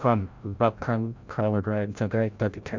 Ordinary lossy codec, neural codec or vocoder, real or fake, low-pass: MP3, 64 kbps; codec, 16 kHz, 0.5 kbps, FreqCodec, larger model; fake; 7.2 kHz